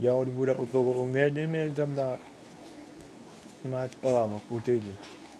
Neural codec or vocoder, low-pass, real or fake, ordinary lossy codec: codec, 24 kHz, 0.9 kbps, WavTokenizer, medium speech release version 2; none; fake; none